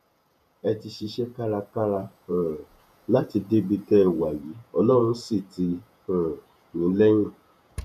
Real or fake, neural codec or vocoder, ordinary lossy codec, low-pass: fake; vocoder, 48 kHz, 128 mel bands, Vocos; none; 14.4 kHz